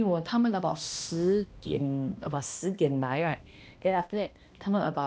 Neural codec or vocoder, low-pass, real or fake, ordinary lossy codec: codec, 16 kHz, 1 kbps, X-Codec, HuBERT features, trained on balanced general audio; none; fake; none